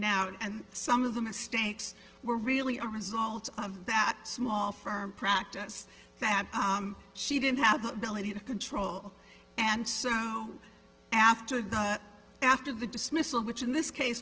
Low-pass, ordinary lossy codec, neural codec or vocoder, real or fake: 7.2 kHz; Opus, 16 kbps; none; real